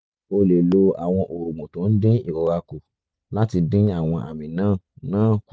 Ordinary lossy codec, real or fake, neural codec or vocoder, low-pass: none; real; none; none